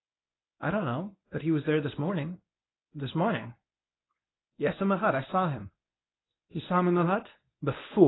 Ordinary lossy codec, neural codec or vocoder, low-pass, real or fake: AAC, 16 kbps; codec, 24 kHz, 0.9 kbps, WavTokenizer, medium speech release version 1; 7.2 kHz; fake